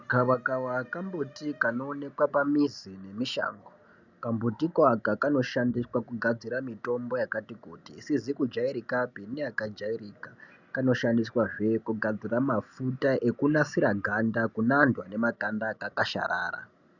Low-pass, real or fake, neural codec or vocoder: 7.2 kHz; real; none